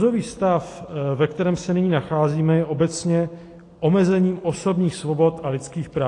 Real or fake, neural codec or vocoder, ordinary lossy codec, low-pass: real; none; AAC, 48 kbps; 10.8 kHz